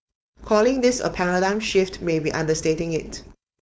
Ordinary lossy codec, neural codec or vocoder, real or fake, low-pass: none; codec, 16 kHz, 4.8 kbps, FACodec; fake; none